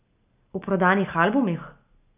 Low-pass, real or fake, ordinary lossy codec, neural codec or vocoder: 3.6 kHz; real; none; none